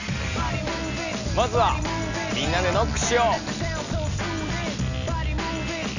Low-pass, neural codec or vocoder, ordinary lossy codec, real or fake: 7.2 kHz; none; none; real